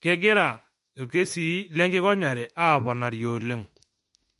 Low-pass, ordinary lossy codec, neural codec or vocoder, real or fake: 14.4 kHz; MP3, 48 kbps; autoencoder, 48 kHz, 32 numbers a frame, DAC-VAE, trained on Japanese speech; fake